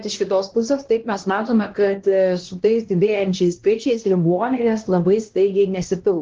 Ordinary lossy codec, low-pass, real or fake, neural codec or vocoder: Opus, 16 kbps; 7.2 kHz; fake; codec, 16 kHz, 1 kbps, X-Codec, HuBERT features, trained on LibriSpeech